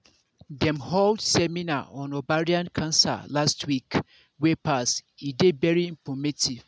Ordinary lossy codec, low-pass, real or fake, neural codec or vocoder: none; none; real; none